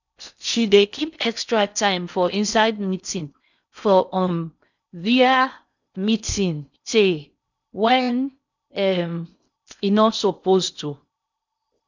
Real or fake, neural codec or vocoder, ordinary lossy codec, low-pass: fake; codec, 16 kHz in and 24 kHz out, 0.6 kbps, FocalCodec, streaming, 4096 codes; none; 7.2 kHz